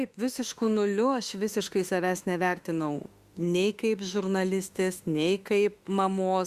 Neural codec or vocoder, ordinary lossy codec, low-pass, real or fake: autoencoder, 48 kHz, 32 numbers a frame, DAC-VAE, trained on Japanese speech; Opus, 64 kbps; 14.4 kHz; fake